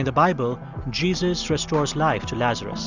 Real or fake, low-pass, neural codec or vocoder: real; 7.2 kHz; none